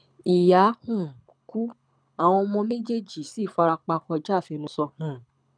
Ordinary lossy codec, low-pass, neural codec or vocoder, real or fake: none; 9.9 kHz; codec, 24 kHz, 6 kbps, HILCodec; fake